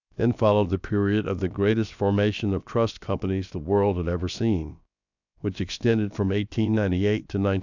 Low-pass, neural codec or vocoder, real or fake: 7.2 kHz; codec, 16 kHz, about 1 kbps, DyCAST, with the encoder's durations; fake